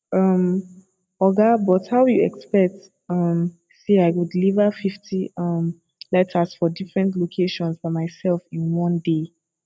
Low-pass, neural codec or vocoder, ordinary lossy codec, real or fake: none; none; none; real